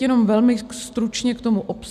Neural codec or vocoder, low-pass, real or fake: none; 14.4 kHz; real